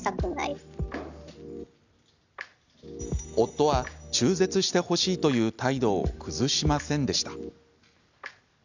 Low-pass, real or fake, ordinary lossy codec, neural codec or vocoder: 7.2 kHz; real; none; none